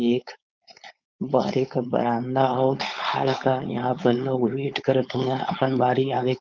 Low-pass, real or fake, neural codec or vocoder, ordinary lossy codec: 7.2 kHz; fake; codec, 16 kHz, 4.8 kbps, FACodec; Opus, 32 kbps